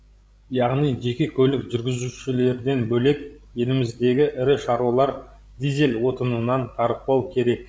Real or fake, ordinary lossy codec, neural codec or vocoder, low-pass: fake; none; codec, 16 kHz, 16 kbps, FreqCodec, larger model; none